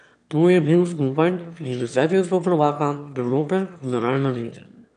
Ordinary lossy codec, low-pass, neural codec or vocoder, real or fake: none; 9.9 kHz; autoencoder, 22.05 kHz, a latent of 192 numbers a frame, VITS, trained on one speaker; fake